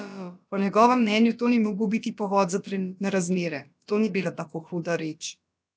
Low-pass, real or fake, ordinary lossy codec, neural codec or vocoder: none; fake; none; codec, 16 kHz, about 1 kbps, DyCAST, with the encoder's durations